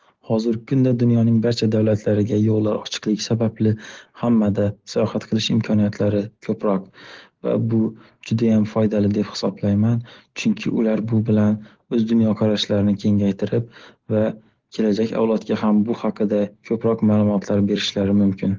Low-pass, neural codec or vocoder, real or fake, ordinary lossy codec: 7.2 kHz; none; real; Opus, 16 kbps